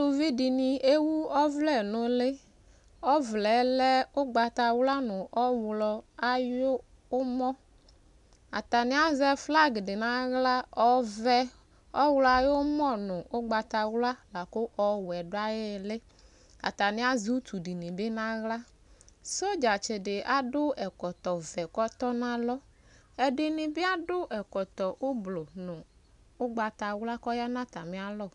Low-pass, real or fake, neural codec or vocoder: 10.8 kHz; real; none